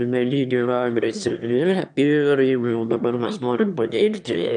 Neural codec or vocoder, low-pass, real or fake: autoencoder, 22.05 kHz, a latent of 192 numbers a frame, VITS, trained on one speaker; 9.9 kHz; fake